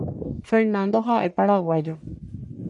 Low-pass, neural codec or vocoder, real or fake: 10.8 kHz; codec, 44.1 kHz, 1.7 kbps, Pupu-Codec; fake